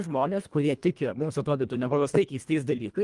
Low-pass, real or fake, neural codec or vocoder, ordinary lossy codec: 10.8 kHz; fake; codec, 24 kHz, 1.5 kbps, HILCodec; Opus, 32 kbps